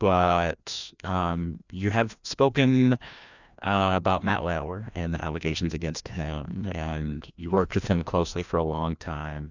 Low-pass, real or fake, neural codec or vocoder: 7.2 kHz; fake; codec, 16 kHz, 1 kbps, FreqCodec, larger model